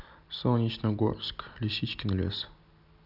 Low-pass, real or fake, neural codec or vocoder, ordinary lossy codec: 5.4 kHz; real; none; none